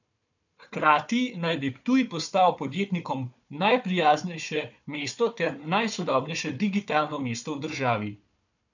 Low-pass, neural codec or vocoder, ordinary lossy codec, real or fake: 7.2 kHz; codec, 16 kHz, 4 kbps, FunCodec, trained on Chinese and English, 50 frames a second; none; fake